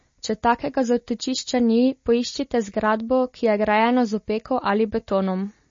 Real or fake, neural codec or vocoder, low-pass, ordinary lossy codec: real; none; 7.2 kHz; MP3, 32 kbps